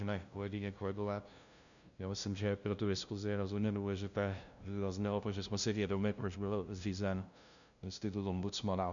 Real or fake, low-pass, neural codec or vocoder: fake; 7.2 kHz; codec, 16 kHz, 0.5 kbps, FunCodec, trained on LibriTTS, 25 frames a second